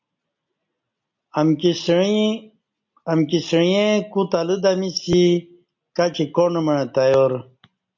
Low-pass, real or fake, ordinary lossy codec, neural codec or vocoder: 7.2 kHz; real; MP3, 64 kbps; none